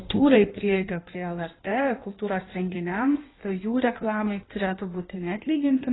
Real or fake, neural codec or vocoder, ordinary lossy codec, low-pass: fake; codec, 16 kHz in and 24 kHz out, 1.1 kbps, FireRedTTS-2 codec; AAC, 16 kbps; 7.2 kHz